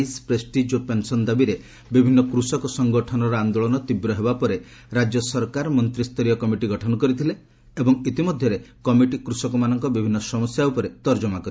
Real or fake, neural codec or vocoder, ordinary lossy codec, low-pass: real; none; none; none